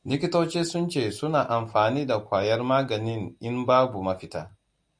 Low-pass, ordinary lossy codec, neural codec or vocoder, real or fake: 9.9 kHz; MP3, 64 kbps; none; real